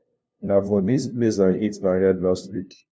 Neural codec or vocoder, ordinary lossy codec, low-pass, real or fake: codec, 16 kHz, 0.5 kbps, FunCodec, trained on LibriTTS, 25 frames a second; none; none; fake